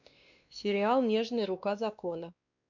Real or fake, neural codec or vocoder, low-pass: fake; codec, 16 kHz, 2 kbps, X-Codec, WavLM features, trained on Multilingual LibriSpeech; 7.2 kHz